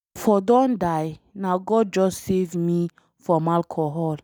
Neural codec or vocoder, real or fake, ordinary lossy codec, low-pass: none; real; none; none